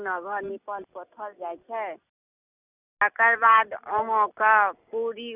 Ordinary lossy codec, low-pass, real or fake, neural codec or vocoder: none; 3.6 kHz; real; none